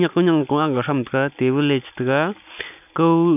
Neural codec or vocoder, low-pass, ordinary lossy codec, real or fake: none; 3.6 kHz; none; real